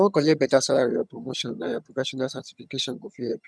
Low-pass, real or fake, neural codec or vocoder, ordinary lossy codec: none; fake; vocoder, 22.05 kHz, 80 mel bands, HiFi-GAN; none